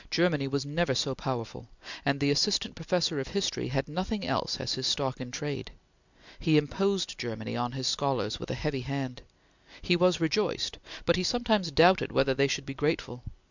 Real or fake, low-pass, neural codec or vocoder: real; 7.2 kHz; none